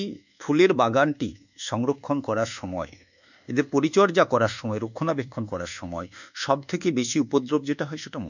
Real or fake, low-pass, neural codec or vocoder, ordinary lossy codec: fake; 7.2 kHz; codec, 24 kHz, 1.2 kbps, DualCodec; none